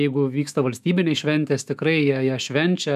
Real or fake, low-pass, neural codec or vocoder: fake; 14.4 kHz; autoencoder, 48 kHz, 128 numbers a frame, DAC-VAE, trained on Japanese speech